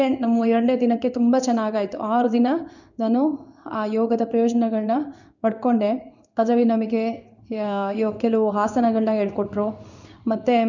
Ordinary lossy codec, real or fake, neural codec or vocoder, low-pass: none; fake; codec, 16 kHz in and 24 kHz out, 1 kbps, XY-Tokenizer; 7.2 kHz